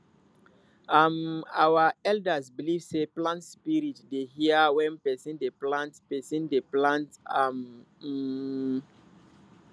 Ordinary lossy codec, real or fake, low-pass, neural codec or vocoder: none; real; none; none